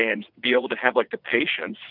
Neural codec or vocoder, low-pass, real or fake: vocoder, 22.05 kHz, 80 mel bands, WaveNeXt; 5.4 kHz; fake